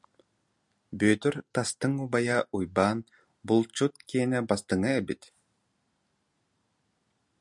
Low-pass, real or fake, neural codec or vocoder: 10.8 kHz; real; none